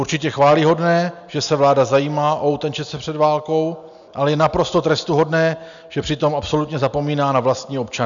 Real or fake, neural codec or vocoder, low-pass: real; none; 7.2 kHz